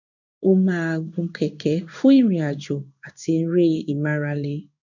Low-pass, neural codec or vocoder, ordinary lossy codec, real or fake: 7.2 kHz; codec, 16 kHz in and 24 kHz out, 1 kbps, XY-Tokenizer; none; fake